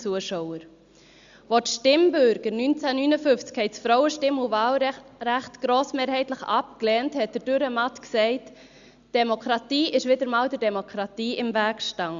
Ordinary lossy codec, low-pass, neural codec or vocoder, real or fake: Opus, 64 kbps; 7.2 kHz; none; real